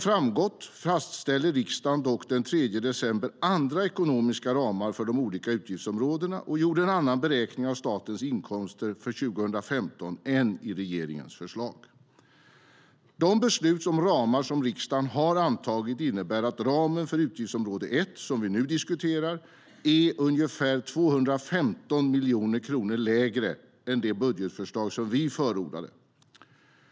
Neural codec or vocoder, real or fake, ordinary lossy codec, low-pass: none; real; none; none